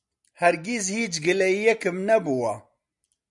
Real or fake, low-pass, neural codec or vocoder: real; 10.8 kHz; none